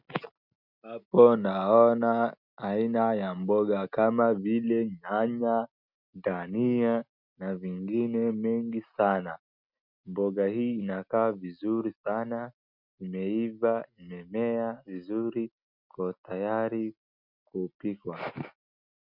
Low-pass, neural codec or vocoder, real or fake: 5.4 kHz; none; real